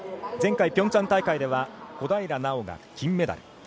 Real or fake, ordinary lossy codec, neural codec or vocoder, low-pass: real; none; none; none